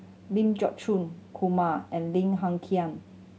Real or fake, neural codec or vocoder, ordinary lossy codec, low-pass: real; none; none; none